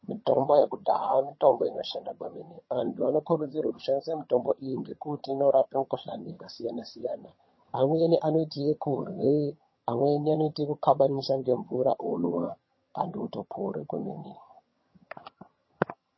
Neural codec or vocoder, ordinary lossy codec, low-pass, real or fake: vocoder, 22.05 kHz, 80 mel bands, HiFi-GAN; MP3, 24 kbps; 7.2 kHz; fake